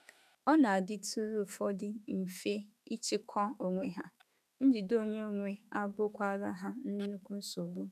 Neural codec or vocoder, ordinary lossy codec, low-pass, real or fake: autoencoder, 48 kHz, 32 numbers a frame, DAC-VAE, trained on Japanese speech; none; 14.4 kHz; fake